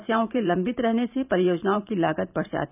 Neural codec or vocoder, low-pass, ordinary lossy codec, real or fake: vocoder, 44.1 kHz, 128 mel bands every 512 samples, BigVGAN v2; 3.6 kHz; none; fake